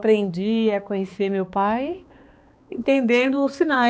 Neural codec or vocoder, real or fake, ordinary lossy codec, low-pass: codec, 16 kHz, 2 kbps, X-Codec, HuBERT features, trained on balanced general audio; fake; none; none